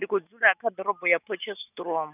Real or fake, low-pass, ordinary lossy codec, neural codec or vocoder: real; 3.6 kHz; none; none